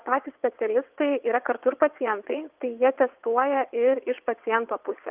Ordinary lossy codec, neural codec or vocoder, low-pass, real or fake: Opus, 32 kbps; vocoder, 22.05 kHz, 80 mel bands, WaveNeXt; 3.6 kHz; fake